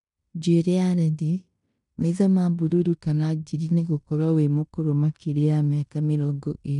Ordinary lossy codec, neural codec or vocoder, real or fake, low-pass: none; codec, 16 kHz in and 24 kHz out, 0.9 kbps, LongCat-Audio-Codec, fine tuned four codebook decoder; fake; 10.8 kHz